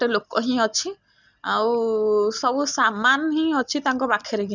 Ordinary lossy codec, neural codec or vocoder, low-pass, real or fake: none; none; 7.2 kHz; real